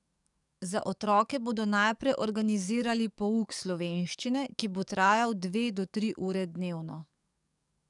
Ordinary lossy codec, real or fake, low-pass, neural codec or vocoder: none; fake; 10.8 kHz; codec, 44.1 kHz, 7.8 kbps, DAC